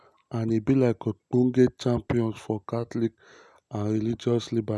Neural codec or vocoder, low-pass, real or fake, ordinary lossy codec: none; none; real; none